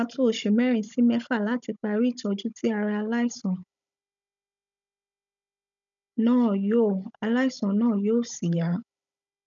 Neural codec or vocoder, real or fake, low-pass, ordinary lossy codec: codec, 16 kHz, 16 kbps, FunCodec, trained on Chinese and English, 50 frames a second; fake; 7.2 kHz; none